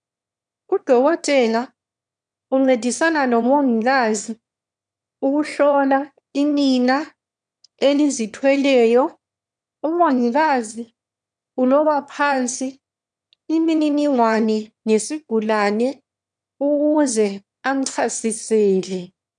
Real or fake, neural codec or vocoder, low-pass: fake; autoencoder, 22.05 kHz, a latent of 192 numbers a frame, VITS, trained on one speaker; 9.9 kHz